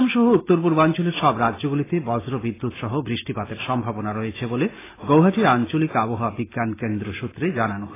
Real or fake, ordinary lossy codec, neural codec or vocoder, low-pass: real; AAC, 16 kbps; none; 3.6 kHz